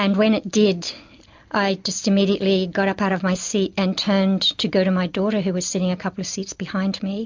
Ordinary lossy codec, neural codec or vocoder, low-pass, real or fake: MP3, 64 kbps; none; 7.2 kHz; real